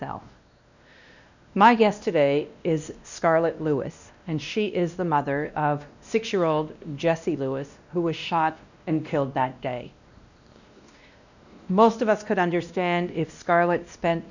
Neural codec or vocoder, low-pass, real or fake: codec, 16 kHz, 1 kbps, X-Codec, WavLM features, trained on Multilingual LibriSpeech; 7.2 kHz; fake